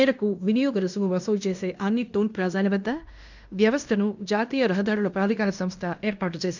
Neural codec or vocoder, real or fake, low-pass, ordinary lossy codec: codec, 16 kHz in and 24 kHz out, 0.9 kbps, LongCat-Audio-Codec, fine tuned four codebook decoder; fake; 7.2 kHz; none